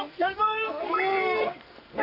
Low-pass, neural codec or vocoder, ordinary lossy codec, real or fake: 5.4 kHz; codec, 44.1 kHz, 2.6 kbps, SNAC; none; fake